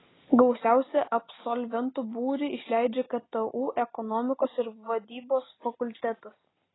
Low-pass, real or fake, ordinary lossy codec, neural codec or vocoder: 7.2 kHz; real; AAC, 16 kbps; none